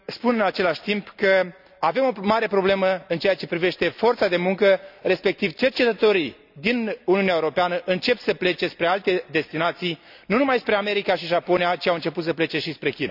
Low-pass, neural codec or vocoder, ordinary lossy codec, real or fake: 5.4 kHz; none; none; real